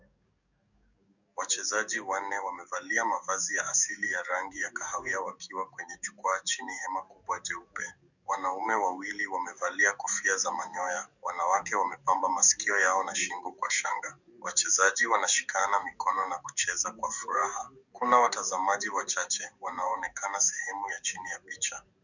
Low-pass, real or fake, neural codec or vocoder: 7.2 kHz; fake; codec, 44.1 kHz, 7.8 kbps, DAC